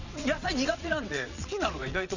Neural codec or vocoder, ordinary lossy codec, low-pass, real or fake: vocoder, 22.05 kHz, 80 mel bands, WaveNeXt; none; 7.2 kHz; fake